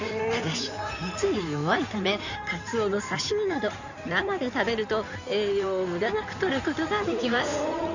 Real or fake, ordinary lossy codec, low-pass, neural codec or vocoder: fake; none; 7.2 kHz; codec, 16 kHz in and 24 kHz out, 2.2 kbps, FireRedTTS-2 codec